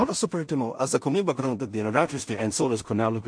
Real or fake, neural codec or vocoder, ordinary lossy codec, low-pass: fake; codec, 16 kHz in and 24 kHz out, 0.4 kbps, LongCat-Audio-Codec, two codebook decoder; MP3, 48 kbps; 9.9 kHz